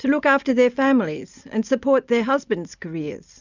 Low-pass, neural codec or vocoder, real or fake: 7.2 kHz; none; real